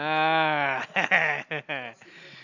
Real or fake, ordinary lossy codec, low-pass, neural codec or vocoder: real; none; 7.2 kHz; none